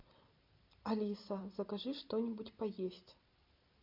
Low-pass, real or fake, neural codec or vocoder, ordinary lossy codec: 5.4 kHz; real; none; MP3, 48 kbps